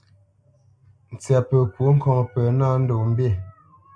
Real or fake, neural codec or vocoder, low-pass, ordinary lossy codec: real; none; 9.9 kHz; AAC, 64 kbps